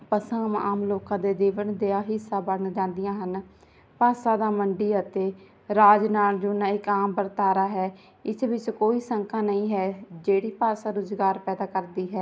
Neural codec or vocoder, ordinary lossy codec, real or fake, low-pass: none; none; real; none